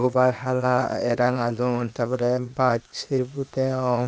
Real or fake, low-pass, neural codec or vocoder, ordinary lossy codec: fake; none; codec, 16 kHz, 0.8 kbps, ZipCodec; none